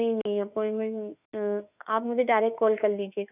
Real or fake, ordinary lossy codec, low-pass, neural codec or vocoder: fake; none; 3.6 kHz; autoencoder, 48 kHz, 32 numbers a frame, DAC-VAE, trained on Japanese speech